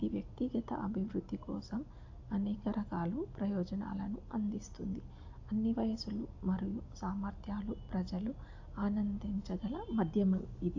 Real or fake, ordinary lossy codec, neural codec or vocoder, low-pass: real; AAC, 48 kbps; none; 7.2 kHz